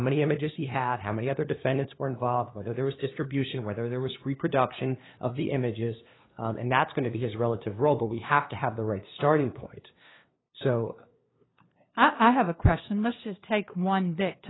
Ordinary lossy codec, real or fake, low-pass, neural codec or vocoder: AAC, 16 kbps; fake; 7.2 kHz; codec, 16 kHz, 2 kbps, FunCodec, trained on LibriTTS, 25 frames a second